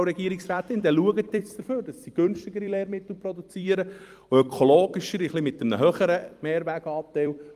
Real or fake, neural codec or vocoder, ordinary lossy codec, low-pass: fake; vocoder, 44.1 kHz, 128 mel bands every 256 samples, BigVGAN v2; Opus, 32 kbps; 14.4 kHz